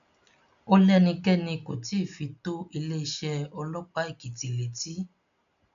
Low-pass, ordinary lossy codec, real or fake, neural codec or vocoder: 7.2 kHz; none; real; none